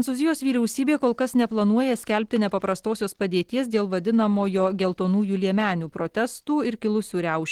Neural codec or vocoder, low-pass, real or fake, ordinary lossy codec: none; 19.8 kHz; real; Opus, 16 kbps